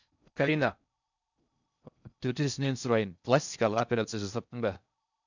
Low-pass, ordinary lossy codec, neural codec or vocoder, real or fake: 7.2 kHz; none; codec, 16 kHz in and 24 kHz out, 0.6 kbps, FocalCodec, streaming, 4096 codes; fake